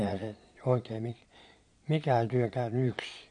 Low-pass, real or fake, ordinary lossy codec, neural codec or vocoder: 9.9 kHz; fake; MP3, 48 kbps; vocoder, 22.05 kHz, 80 mel bands, Vocos